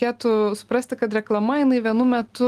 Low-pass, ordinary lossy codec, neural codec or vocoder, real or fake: 14.4 kHz; Opus, 24 kbps; none; real